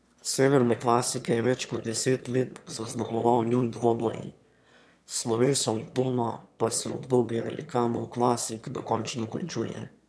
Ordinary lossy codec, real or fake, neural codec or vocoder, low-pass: none; fake; autoencoder, 22.05 kHz, a latent of 192 numbers a frame, VITS, trained on one speaker; none